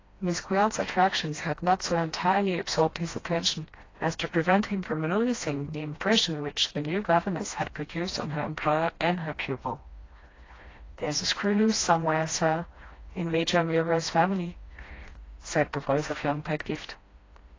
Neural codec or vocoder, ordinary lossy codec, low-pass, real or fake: codec, 16 kHz, 1 kbps, FreqCodec, smaller model; AAC, 32 kbps; 7.2 kHz; fake